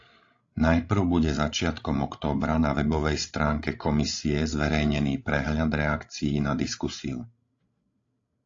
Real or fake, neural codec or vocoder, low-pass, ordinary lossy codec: fake; codec, 16 kHz, 16 kbps, FreqCodec, larger model; 7.2 kHz; AAC, 48 kbps